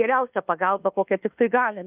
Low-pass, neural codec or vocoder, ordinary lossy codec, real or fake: 3.6 kHz; codec, 24 kHz, 1.2 kbps, DualCodec; Opus, 24 kbps; fake